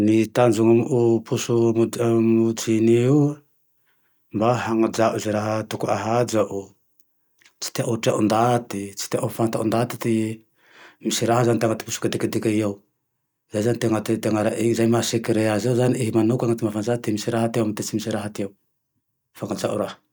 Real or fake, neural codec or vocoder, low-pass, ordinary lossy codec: real; none; none; none